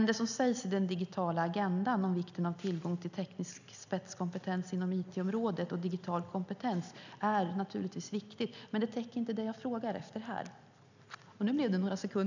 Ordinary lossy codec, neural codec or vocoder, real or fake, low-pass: none; none; real; 7.2 kHz